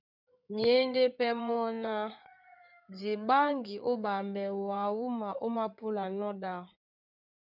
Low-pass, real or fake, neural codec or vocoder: 5.4 kHz; fake; codec, 16 kHz in and 24 kHz out, 2.2 kbps, FireRedTTS-2 codec